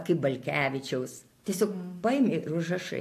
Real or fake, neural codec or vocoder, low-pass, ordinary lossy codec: real; none; 14.4 kHz; AAC, 64 kbps